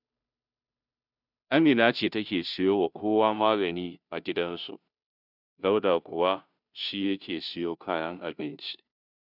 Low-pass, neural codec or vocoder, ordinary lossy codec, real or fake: 5.4 kHz; codec, 16 kHz, 0.5 kbps, FunCodec, trained on Chinese and English, 25 frames a second; none; fake